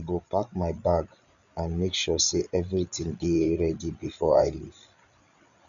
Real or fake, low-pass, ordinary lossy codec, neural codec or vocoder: fake; 7.2 kHz; none; codec, 16 kHz, 8 kbps, FreqCodec, larger model